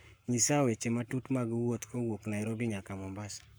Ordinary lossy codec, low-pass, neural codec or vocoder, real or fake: none; none; codec, 44.1 kHz, 7.8 kbps, Pupu-Codec; fake